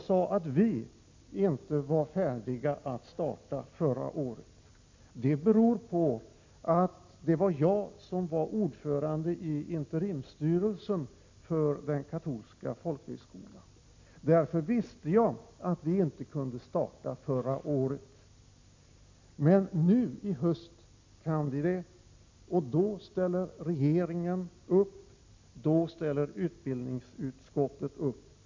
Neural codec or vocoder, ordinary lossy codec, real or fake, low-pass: none; MP3, 48 kbps; real; 7.2 kHz